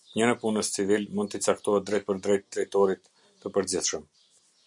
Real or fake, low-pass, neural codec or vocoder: real; 10.8 kHz; none